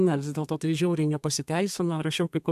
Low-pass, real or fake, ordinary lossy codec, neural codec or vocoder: 14.4 kHz; fake; AAC, 96 kbps; codec, 32 kHz, 1.9 kbps, SNAC